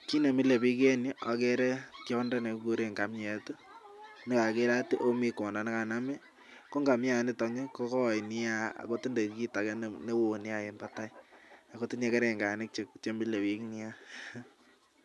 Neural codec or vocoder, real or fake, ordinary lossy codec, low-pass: none; real; none; none